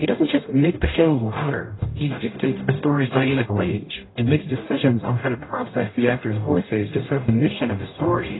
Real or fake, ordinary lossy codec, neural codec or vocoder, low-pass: fake; AAC, 16 kbps; codec, 44.1 kHz, 0.9 kbps, DAC; 7.2 kHz